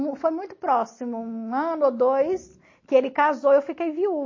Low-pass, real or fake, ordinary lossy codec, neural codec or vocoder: 7.2 kHz; real; MP3, 32 kbps; none